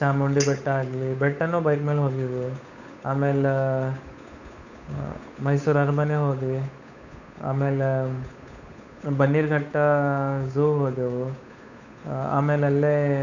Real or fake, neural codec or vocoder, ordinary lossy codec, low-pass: fake; codec, 16 kHz, 8 kbps, FunCodec, trained on Chinese and English, 25 frames a second; none; 7.2 kHz